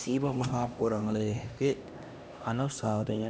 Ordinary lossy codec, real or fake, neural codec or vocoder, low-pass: none; fake; codec, 16 kHz, 1 kbps, X-Codec, HuBERT features, trained on LibriSpeech; none